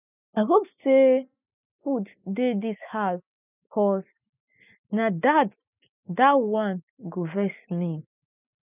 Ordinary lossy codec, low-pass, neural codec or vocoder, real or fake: none; 3.6 kHz; none; real